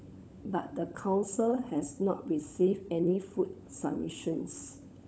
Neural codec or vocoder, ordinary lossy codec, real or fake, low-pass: codec, 16 kHz, 16 kbps, FunCodec, trained on LibriTTS, 50 frames a second; none; fake; none